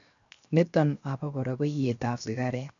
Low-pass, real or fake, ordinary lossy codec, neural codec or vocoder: 7.2 kHz; fake; none; codec, 16 kHz, 0.7 kbps, FocalCodec